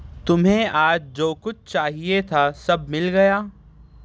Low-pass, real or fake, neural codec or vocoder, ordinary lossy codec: none; real; none; none